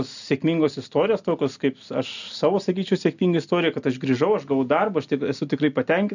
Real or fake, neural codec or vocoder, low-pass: real; none; 7.2 kHz